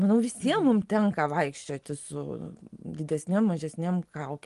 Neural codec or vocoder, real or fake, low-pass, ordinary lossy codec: none; real; 10.8 kHz; Opus, 24 kbps